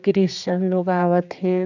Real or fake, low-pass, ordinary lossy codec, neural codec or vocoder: fake; 7.2 kHz; none; codec, 16 kHz, 2 kbps, X-Codec, HuBERT features, trained on general audio